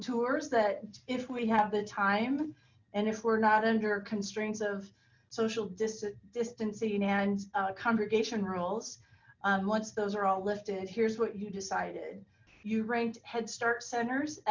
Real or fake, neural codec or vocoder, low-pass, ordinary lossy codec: real; none; 7.2 kHz; MP3, 64 kbps